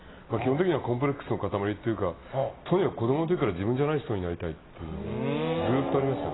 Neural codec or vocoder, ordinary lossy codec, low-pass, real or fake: none; AAC, 16 kbps; 7.2 kHz; real